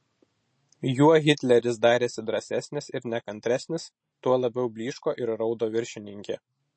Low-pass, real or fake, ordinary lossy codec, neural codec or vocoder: 9.9 kHz; real; MP3, 32 kbps; none